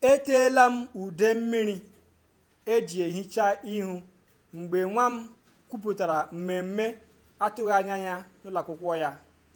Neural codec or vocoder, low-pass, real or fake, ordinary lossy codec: vocoder, 48 kHz, 128 mel bands, Vocos; none; fake; none